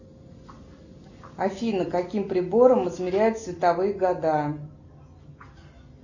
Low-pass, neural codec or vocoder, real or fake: 7.2 kHz; none; real